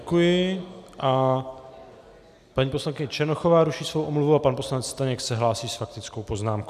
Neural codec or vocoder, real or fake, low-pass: none; real; 14.4 kHz